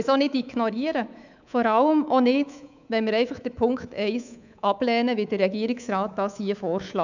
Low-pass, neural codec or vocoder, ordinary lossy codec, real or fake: 7.2 kHz; codec, 24 kHz, 3.1 kbps, DualCodec; none; fake